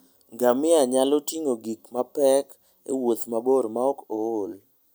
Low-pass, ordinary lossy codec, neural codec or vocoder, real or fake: none; none; none; real